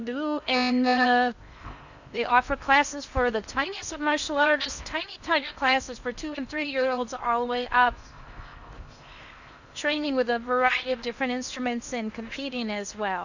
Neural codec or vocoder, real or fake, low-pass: codec, 16 kHz in and 24 kHz out, 0.8 kbps, FocalCodec, streaming, 65536 codes; fake; 7.2 kHz